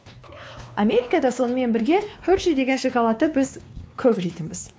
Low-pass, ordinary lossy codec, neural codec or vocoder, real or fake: none; none; codec, 16 kHz, 2 kbps, X-Codec, WavLM features, trained on Multilingual LibriSpeech; fake